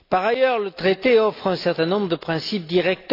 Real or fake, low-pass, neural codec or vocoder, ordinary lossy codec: real; 5.4 kHz; none; AAC, 32 kbps